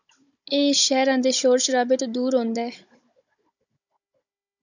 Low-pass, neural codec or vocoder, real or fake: 7.2 kHz; codec, 16 kHz, 16 kbps, FunCodec, trained on Chinese and English, 50 frames a second; fake